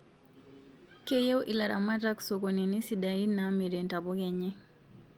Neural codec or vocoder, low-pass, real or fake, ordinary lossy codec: none; 19.8 kHz; real; Opus, 32 kbps